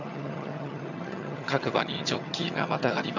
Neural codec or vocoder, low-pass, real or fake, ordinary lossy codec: vocoder, 22.05 kHz, 80 mel bands, HiFi-GAN; 7.2 kHz; fake; none